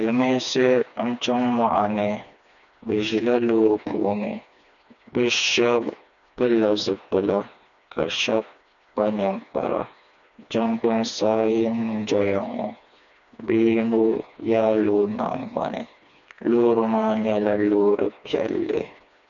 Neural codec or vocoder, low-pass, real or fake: codec, 16 kHz, 2 kbps, FreqCodec, smaller model; 7.2 kHz; fake